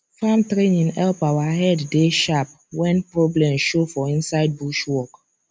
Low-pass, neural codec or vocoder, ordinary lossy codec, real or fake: none; none; none; real